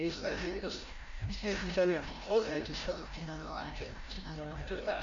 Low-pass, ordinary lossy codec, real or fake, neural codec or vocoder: 7.2 kHz; MP3, 48 kbps; fake; codec, 16 kHz, 0.5 kbps, FreqCodec, larger model